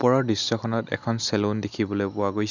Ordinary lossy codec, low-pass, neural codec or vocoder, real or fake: none; 7.2 kHz; none; real